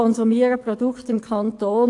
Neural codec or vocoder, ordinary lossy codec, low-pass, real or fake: codec, 44.1 kHz, 7.8 kbps, DAC; AAC, 48 kbps; 10.8 kHz; fake